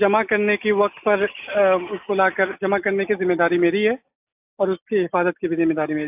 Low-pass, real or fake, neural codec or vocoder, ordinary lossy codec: 3.6 kHz; real; none; AAC, 32 kbps